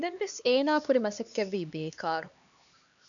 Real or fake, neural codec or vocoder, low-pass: fake; codec, 16 kHz, 2 kbps, X-Codec, HuBERT features, trained on LibriSpeech; 7.2 kHz